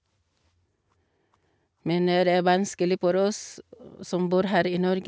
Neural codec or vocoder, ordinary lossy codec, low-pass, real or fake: none; none; none; real